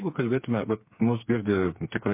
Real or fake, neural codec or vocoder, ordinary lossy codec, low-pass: fake; codec, 16 kHz, 4 kbps, FreqCodec, smaller model; MP3, 24 kbps; 3.6 kHz